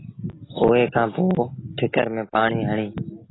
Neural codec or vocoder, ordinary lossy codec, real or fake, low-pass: none; AAC, 16 kbps; real; 7.2 kHz